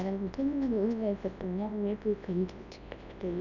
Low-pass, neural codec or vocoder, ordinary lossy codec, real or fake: 7.2 kHz; codec, 24 kHz, 0.9 kbps, WavTokenizer, large speech release; none; fake